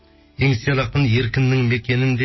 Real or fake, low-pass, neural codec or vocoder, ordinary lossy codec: real; 7.2 kHz; none; MP3, 24 kbps